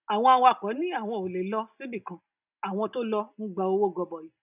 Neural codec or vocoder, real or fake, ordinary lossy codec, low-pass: none; real; none; 3.6 kHz